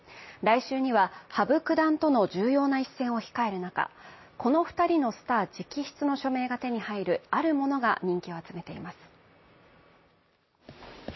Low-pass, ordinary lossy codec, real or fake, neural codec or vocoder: 7.2 kHz; MP3, 24 kbps; real; none